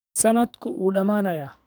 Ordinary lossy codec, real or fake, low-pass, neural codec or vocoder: none; fake; none; codec, 44.1 kHz, 2.6 kbps, SNAC